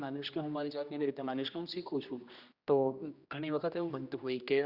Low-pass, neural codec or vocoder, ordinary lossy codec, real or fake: 5.4 kHz; codec, 16 kHz, 1 kbps, X-Codec, HuBERT features, trained on general audio; Opus, 64 kbps; fake